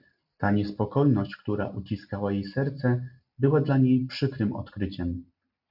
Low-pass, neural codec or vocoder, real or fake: 5.4 kHz; none; real